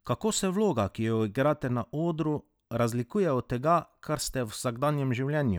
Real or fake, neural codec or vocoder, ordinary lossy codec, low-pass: real; none; none; none